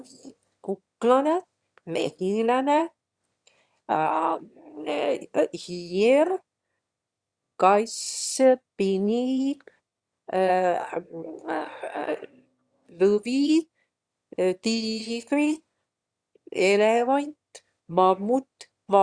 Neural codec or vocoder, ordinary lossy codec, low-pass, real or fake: autoencoder, 22.05 kHz, a latent of 192 numbers a frame, VITS, trained on one speaker; Opus, 64 kbps; 9.9 kHz; fake